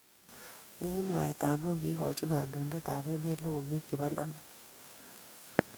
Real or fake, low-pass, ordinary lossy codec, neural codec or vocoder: fake; none; none; codec, 44.1 kHz, 2.6 kbps, DAC